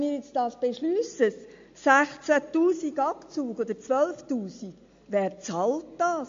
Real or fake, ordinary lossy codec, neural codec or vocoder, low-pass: real; MP3, 48 kbps; none; 7.2 kHz